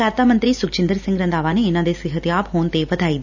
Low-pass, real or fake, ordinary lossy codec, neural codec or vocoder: 7.2 kHz; real; none; none